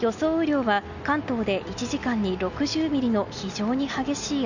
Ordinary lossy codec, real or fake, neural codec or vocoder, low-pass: none; real; none; 7.2 kHz